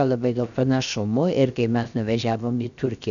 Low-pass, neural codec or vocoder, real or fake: 7.2 kHz; codec, 16 kHz, 0.7 kbps, FocalCodec; fake